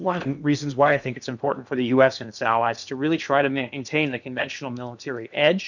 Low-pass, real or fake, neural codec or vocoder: 7.2 kHz; fake; codec, 16 kHz in and 24 kHz out, 0.8 kbps, FocalCodec, streaming, 65536 codes